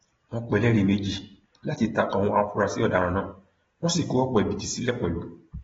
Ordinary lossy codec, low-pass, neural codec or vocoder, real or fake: AAC, 24 kbps; 7.2 kHz; none; real